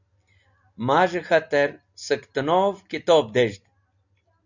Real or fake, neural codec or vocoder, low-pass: real; none; 7.2 kHz